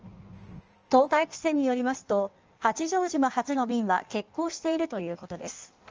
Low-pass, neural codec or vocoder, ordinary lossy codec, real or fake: 7.2 kHz; codec, 16 kHz in and 24 kHz out, 1.1 kbps, FireRedTTS-2 codec; Opus, 24 kbps; fake